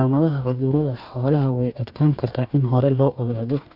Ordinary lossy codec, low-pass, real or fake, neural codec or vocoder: none; 5.4 kHz; fake; codec, 44.1 kHz, 2.6 kbps, DAC